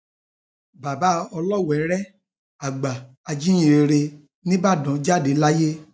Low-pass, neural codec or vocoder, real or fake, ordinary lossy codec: none; none; real; none